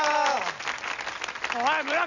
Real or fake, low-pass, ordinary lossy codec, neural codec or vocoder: real; 7.2 kHz; none; none